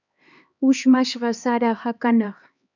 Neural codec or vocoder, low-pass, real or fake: codec, 16 kHz, 2 kbps, X-Codec, HuBERT features, trained on LibriSpeech; 7.2 kHz; fake